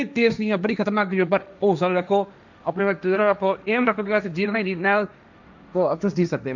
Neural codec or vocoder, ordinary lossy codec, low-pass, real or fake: codec, 16 kHz, 1.1 kbps, Voila-Tokenizer; none; 7.2 kHz; fake